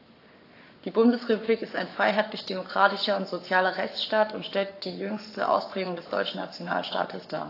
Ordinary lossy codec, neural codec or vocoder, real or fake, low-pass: AAC, 32 kbps; codec, 44.1 kHz, 7.8 kbps, Pupu-Codec; fake; 5.4 kHz